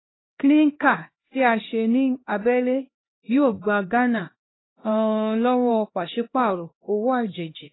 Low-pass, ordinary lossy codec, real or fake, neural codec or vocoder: 7.2 kHz; AAC, 16 kbps; fake; codec, 16 kHz, 1 kbps, X-Codec, HuBERT features, trained on LibriSpeech